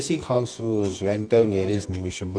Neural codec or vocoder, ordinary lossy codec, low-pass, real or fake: codec, 24 kHz, 0.9 kbps, WavTokenizer, medium music audio release; none; 9.9 kHz; fake